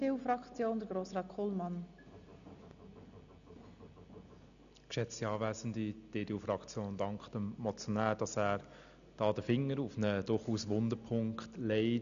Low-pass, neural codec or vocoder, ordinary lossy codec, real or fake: 7.2 kHz; none; none; real